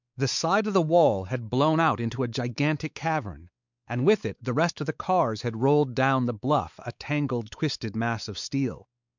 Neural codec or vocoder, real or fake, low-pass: codec, 16 kHz, 4 kbps, X-Codec, WavLM features, trained on Multilingual LibriSpeech; fake; 7.2 kHz